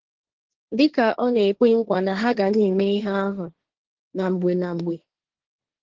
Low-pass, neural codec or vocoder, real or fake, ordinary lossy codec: 7.2 kHz; codec, 16 kHz, 1.1 kbps, Voila-Tokenizer; fake; Opus, 16 kbps